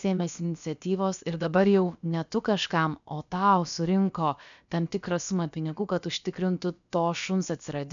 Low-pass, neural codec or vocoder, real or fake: 7.2 kHz; codec, 16 kHz, about 1 kbps, DyCAST, with the encoder's durations; fake